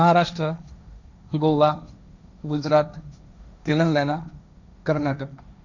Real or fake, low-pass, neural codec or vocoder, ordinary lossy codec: fake; 7.2 kHz; codec, 16 kHz, 1.1 kbps, Voila-Tokenizer; none